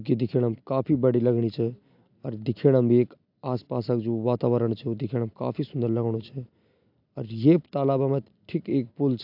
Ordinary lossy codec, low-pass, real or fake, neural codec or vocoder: AAC, 48 kbps; 5.4 kHz; real; none